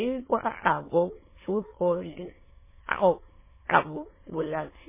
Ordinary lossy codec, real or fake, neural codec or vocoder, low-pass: MP3, 16 kbps; fake; autoencoder, 22.05 kHz, a latent of 192 numbers a frame, VITS, trained on many speakers; 3.6 kHz